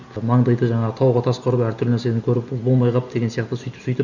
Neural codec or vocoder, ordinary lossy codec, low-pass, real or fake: none; none; 7.2 kHz; real